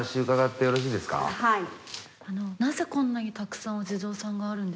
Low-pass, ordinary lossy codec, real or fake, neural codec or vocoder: none; none; real; none